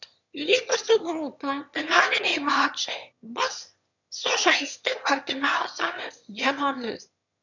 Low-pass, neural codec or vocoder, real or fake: 7.2 kHz; autoencoder, 22.05 kHz, a latent of 192 numbers a frame, VITS, trained on one speaker; fake